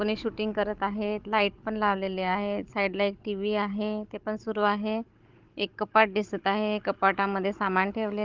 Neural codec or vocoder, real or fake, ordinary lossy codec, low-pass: codec, 16 kHz, 16 kbps, FunCodec, trained on Chinese and English, 50 frames a second; fake; Opus, 32 kbps; 7.2 kHz